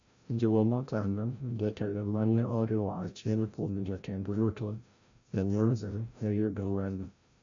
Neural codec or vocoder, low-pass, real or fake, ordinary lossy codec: codec, 16 kHz, 0.5 kbps, FreqCodec, larger model; 7.2 kHz; fake; AAC, 64 kbps